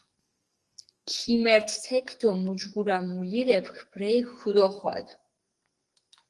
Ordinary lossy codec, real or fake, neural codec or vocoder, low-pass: Opus, 24 kbps; fake; codec, 44.1 kHz, 2.6 kbps, SNAC; 10.8 kHz